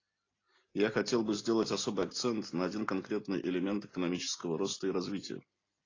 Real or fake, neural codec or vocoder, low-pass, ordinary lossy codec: real; none; 7.2 kHz; AAC, 32 kbps